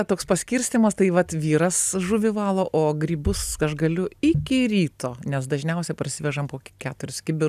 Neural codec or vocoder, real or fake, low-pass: none; real; 14.4 kHz